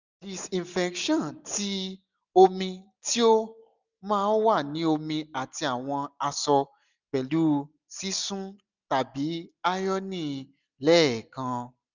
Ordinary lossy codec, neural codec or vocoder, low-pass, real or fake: none; none; 7.2 kHz; real